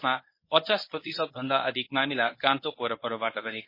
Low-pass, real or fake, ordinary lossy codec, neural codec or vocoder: 5.4 kHz; fake; MP3, 24 kbps; codec, 24 kHz, 0.9 kbps, WavTokenizer, medium speech release version 1